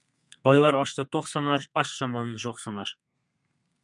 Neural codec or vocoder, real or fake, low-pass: codec, 32 kHz, 1.9 kbps, SNAC; fake; 10.8 kHz